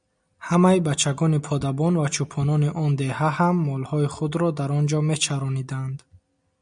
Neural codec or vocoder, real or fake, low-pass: none; real; 9.9 kHz